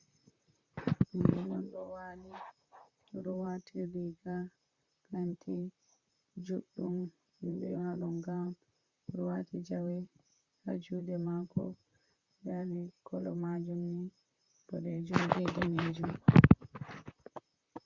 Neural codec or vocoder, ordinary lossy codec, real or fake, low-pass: vocoder, 44.1 kHz, 128 mel bands, Pupu-Vocoder; Opus, 64 kbps; fake; 7.2 kHz